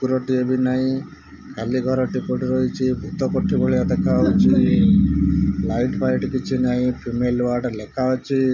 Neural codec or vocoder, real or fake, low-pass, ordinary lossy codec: none; real; 7.2 kHz; none